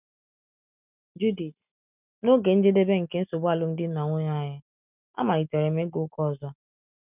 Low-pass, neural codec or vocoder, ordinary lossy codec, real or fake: 3.6 kHz; none; none; real